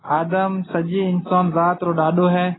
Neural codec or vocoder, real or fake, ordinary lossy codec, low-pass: none; real; AAC, 16 kbps; 7.2 kHz